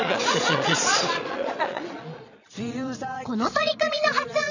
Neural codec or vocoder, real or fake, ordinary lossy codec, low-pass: vocoder, 22.05 kHz, 80 mel bands, Vocos; fake; none; 7.2 kHz